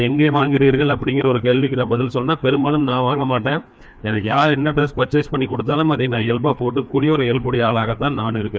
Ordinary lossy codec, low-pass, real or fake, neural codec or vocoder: none; none; fake; codec, 16 kHz, 2 kbps, FreqCodec, larger model